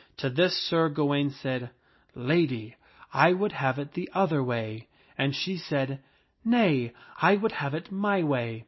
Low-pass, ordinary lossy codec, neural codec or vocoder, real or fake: 7.2 kHz; MP3, 24 kbps; none; real